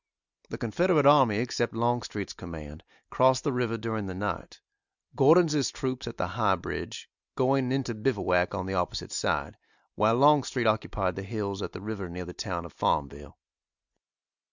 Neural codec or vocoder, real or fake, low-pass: none; real; 7.2 kHz